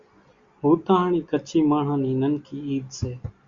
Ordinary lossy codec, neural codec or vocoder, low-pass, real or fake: Opus, 64 kbps; none; 7.2 kHz; real